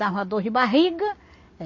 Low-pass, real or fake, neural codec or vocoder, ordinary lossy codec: 7.2 kHz; real; none; MP3, 32 kbps